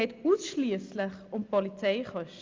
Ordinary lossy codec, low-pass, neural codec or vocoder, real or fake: Opus, 24 kbps; 7.2 kHz; none; real